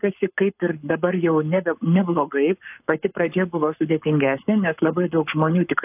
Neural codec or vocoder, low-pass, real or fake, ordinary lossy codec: codec, 44.1 kHz, 7.8 kbps, Pupu-Codec; 3.6 kHz; fake; AAC, 32 kbps